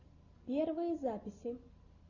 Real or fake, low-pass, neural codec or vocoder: real; 7.2 kHz; none